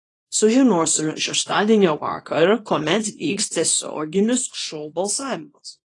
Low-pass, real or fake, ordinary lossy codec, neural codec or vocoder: 10.8 kHz; fake; AAC, 32 kbps; codec, 24 kHz, 0.9 kbps, WavTokenizer, small release